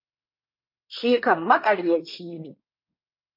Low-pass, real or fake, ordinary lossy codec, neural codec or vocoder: 5.4 kHz; fake; MP3, 32 kbps; codec, 24 kHz, 1 kbps, SNAC